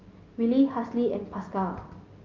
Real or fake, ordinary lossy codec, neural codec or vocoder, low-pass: real; Opus, 24 kbps; none; 7.2 kHz